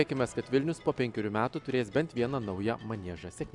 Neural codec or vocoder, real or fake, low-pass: none; real; 10.8 kHz